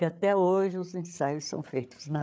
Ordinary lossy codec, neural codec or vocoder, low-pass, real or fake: none; codec, 16 kHz, 8 kbps, FreqCodec, larger model; none; fake